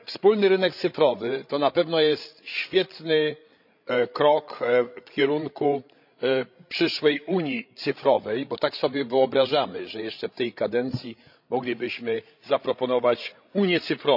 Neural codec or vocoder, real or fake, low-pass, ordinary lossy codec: codec, 16 kHz, 16 kbps, FreqCodec, larger model; fake; 5.4 kHz; none